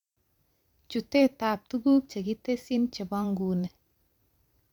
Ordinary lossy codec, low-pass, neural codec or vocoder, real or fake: none; 19.8 kHz; vocoder, 44.1 kHz, 128 mel bands every 512 samples, BigVGAN v2; fake